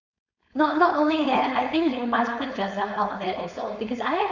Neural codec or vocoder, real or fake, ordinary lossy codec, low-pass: codec, 16 kHz, 4.8 kbps, FACodec; fake; none; 7.2 kHz